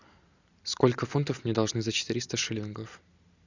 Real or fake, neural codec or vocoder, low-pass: real; none; 7.2 kHz